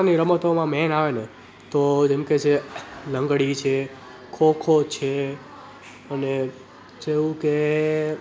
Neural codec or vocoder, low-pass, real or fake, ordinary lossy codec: none; none; real; none